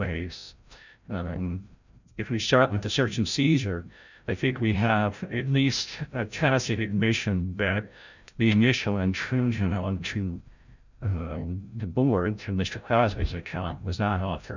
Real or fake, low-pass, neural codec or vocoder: fake; 7.2 kHz; codec, 16 kHz, 0.5 kbps, FreqCodec, larger model